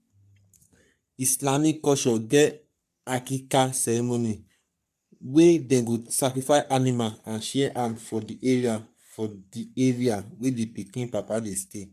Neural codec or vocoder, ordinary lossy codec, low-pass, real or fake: codec, 44.1 kHz, 3.4 kbps, Pupu-Codec; MP3, 96 kbps; 14.4 kHz; fake